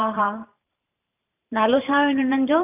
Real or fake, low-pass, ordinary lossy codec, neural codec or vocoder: fake; 3.6 kHz; none; vocoder, 44.1 kHz, 128 mel bands every 512 samples, BigVGAN v2